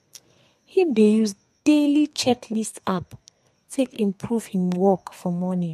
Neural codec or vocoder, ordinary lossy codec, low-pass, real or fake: codec, 32 kHz, 1.9 kbps, SNAC; MP3, 64 kbps; 14.4 kHz; fake